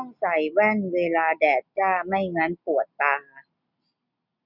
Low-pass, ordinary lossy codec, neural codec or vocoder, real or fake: 5.4 kHz; none; none; real